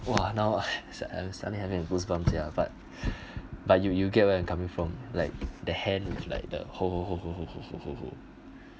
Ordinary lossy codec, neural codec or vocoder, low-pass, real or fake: none; none; none; real